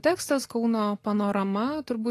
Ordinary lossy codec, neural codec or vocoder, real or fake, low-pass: AAC, 48 kbps; none; real; 14.4 kHz